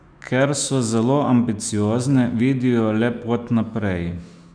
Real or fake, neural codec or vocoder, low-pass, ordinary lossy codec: fake; autoencoder, 48 kHz, 128 numbers a frame, DAC-VAE, trained on Japanese speech; 9.9 kHz; none